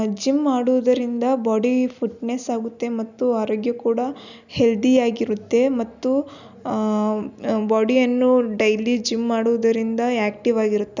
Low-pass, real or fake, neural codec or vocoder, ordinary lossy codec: 7.2 kHz; real; none; none